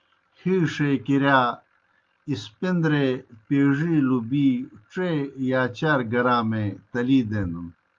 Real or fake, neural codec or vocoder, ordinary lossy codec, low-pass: real; none; Opus, 32 kbps; 7.2 kHz